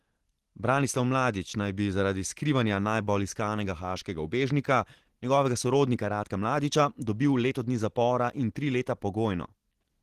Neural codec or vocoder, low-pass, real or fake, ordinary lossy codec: none; 14.4 kHz; real; Opus, 16 kbps